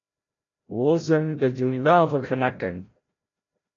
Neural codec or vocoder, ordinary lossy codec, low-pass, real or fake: codec, 16 kHz, 0.5 kbps, FreqCodec, larger model; AAC, 32 kbps; 7.2 kHz; fake